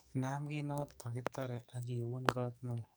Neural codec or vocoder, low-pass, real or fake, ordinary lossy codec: codec, 44.1 kHz, 2.6 kbps, SNAC; none; fake; none